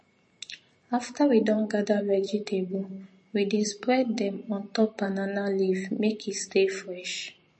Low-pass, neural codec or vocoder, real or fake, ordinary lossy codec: 10.8 kHz; vocoder, 44.1 kHz, 128 mel bands every 512 samples, BigVGAN v2; fake; MP3, 32 kbps